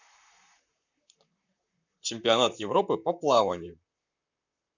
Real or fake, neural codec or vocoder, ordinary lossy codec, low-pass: fake; vocoder, 44.1 kHz, 128 mel bands, Pupu-Vocoder; none; 7.2 kHz